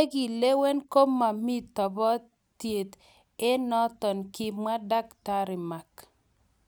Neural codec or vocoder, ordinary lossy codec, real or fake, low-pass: none; none; real; none